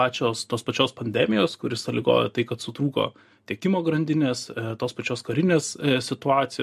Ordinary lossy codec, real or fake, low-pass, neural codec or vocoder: MP3, 64 kbps; real; 14.4 kHz; none